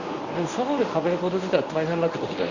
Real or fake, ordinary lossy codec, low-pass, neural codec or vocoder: fake; Opus, 64 kbps; 7.2 kHz; codec, 24 kHz, 0.9 kbps, WavTokenizer, medium speech release version 1